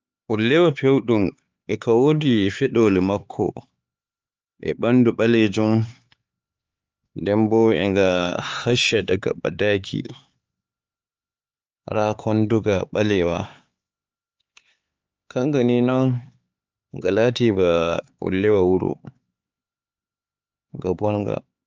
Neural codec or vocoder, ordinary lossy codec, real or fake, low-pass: codec, 16 kHz, 4 kbps, X-Codec, HuBERT features, trained on LibriSpeech; Opus, 24 kbps; fake; 7.2 kHz